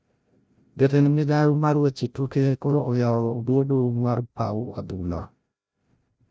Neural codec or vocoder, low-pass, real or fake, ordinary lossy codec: codec, 16 kHz, 0.5 kbps, FreqCodec, larger model; none; fake; none